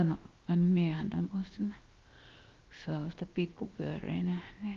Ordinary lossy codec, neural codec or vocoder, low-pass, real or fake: Opus, 32 kbps; codec, 16 kHz, 0.7 kbps, FocalCodec; 7.2 kHz; fake